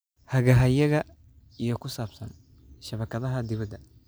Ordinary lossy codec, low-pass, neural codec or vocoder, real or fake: none; none; none; real